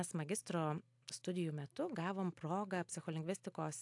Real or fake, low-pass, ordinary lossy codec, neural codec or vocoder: real; 10.8 kHz; AAC, 64 kbps; none